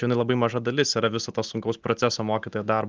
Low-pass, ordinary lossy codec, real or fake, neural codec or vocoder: 7.2 kHz; Opus, 24 kbps; real; none